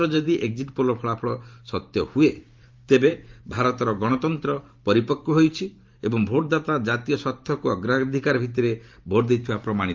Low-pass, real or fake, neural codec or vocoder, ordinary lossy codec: 7.2 kHz; fake; vocoder, 44.1 kHz, 128 mel bands every 512 samples, BigVGAN v2; Opus, 32 kbps